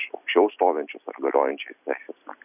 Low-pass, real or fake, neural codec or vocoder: 3.6 kHz; real; none